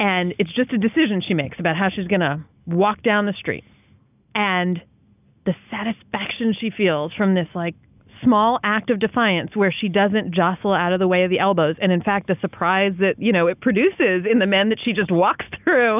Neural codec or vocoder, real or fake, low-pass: none; real; 3.6 kHz